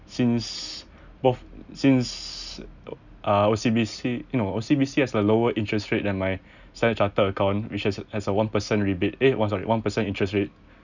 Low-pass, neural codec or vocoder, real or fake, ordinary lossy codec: 7.2 kHz; none; real; none